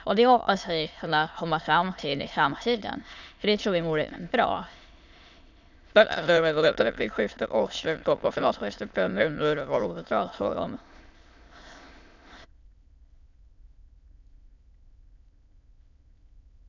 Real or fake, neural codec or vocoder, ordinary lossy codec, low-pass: fake; autoencoder, 22.05 kHz, a latent of 192 numbers a frame, VITS, trained on many speakers; none; 7.2 kHz